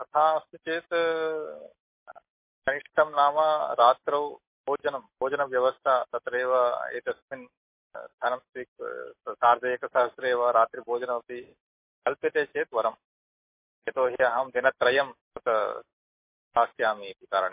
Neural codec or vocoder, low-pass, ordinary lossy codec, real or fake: none; 3.6 kHz; MP3, 24 kbps; real